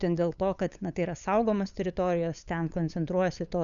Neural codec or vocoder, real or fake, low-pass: codec, 16 kHz, 8 kbps, FunCodec, trained on LibriTTS, 25 frames a second; fake; 7.2 kHz